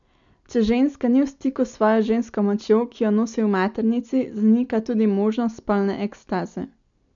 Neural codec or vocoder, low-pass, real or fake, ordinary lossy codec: none; 7.2 kHz; real; none